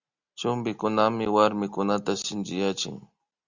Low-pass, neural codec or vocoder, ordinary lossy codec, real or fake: 7.2 kHz; none; Opus, 64 kbps; real